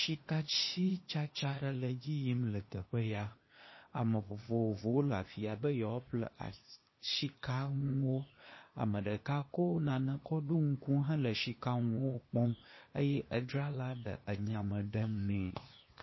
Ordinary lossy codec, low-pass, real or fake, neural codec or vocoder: MP3, 24 kbps; 7.2 kHz; fake; codec, 16 kHz, 0.8 kbps, ZipCodec